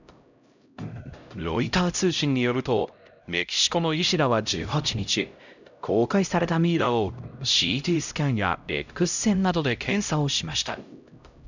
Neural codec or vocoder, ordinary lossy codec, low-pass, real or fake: codec, 16 kHz, 0.5 kbps, X-Codec, HuBERT features, trained on LibriSpeech; none; 7.2 kHz; fake